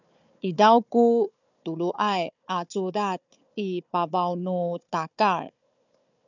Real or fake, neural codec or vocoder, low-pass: fake; codec, 16 kHz, 4 kbps, FunCodec, trained on Chinese and English, 50 frames a second; 7.2 kHz